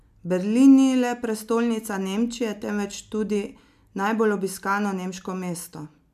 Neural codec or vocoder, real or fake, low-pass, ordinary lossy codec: none; real; 14.4 kHz; MP3, 96 kbps